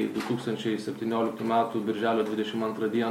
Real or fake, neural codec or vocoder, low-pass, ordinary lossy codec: real; none; 19.8 kHz; MP3, 64 kbps